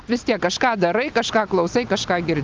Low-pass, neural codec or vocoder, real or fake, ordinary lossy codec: 7.2 kHz; none; real; Opus, 24 kbps